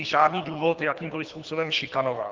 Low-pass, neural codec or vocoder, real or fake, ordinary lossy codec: 7.2 kHz; codec, 24 kHz, 3 kbps, HILCodec; fake; Opus, 32 kbps